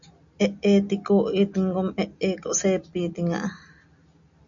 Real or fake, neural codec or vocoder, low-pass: real; none; 7.2 kHz